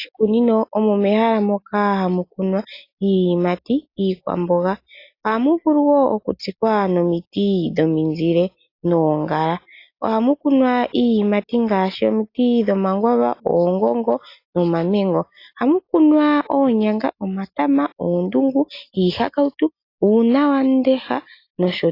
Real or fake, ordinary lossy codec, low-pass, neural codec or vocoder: real; AAC, 32 kbps; 5.4 kHz; none